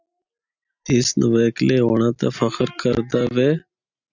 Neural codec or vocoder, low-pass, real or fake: none; 7.2 kHz; real